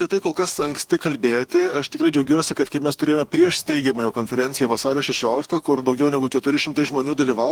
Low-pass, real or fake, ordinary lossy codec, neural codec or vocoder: 19.8 kHz; fake; Opus, 32 kbps; codec, 44.1 kHz, 2.6 kbps, DAC